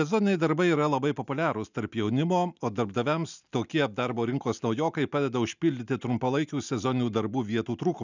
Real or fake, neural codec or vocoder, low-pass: real; none; 7.2 kHz